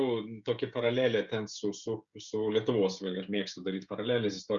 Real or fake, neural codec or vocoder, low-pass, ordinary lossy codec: fake; codec, 16 kHz, 16 kbps, FreqCodec, smaller model; 7.2 kHz; Opus, 64 kbps